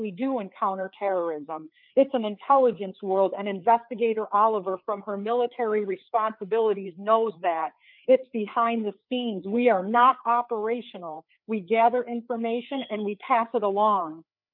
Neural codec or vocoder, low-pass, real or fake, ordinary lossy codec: codec, 16 kHz, 4 kbps, FreqCodec, larger model; 5.4 kHz; fake; MP3, 48 kbps